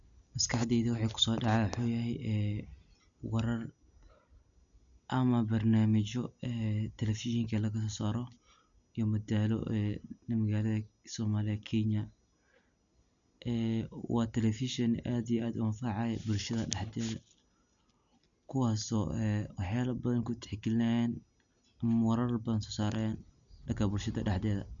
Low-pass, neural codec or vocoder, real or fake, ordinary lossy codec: 7.2 kHz; none; real; none